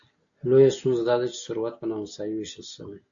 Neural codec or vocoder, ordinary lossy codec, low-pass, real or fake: none; AAC, 64 kbps; 7.2 kHz; real